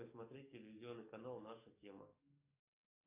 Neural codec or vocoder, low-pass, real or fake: codec, 44.1 kHz, 7.8 kbps, DAC; 3.6 kHz; fake